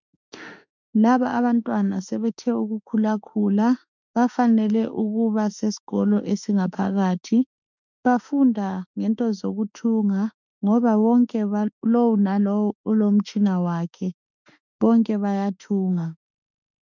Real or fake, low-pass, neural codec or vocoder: fake; 7.2 kHz; autoencoder, 48 kHz, 32 numbers a frame, DAC-VAE, trained on Japanese speech